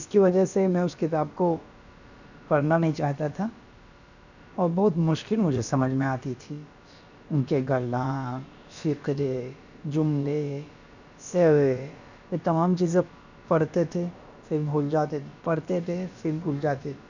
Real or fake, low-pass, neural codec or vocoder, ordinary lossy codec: fake; 7.2 kHz; codec, 16 kHz, about 1 kbps, DyCAST, with the encoder's durations; none